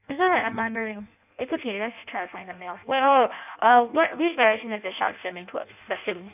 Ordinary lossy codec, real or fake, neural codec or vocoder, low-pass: none; fake; codec, 16 kHz in and 24 kHz out, 0.6 kbps, FireRedTTS-2 codec; 3.6 kHz